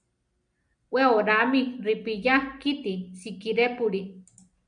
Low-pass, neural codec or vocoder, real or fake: 9.9 kHz; none; real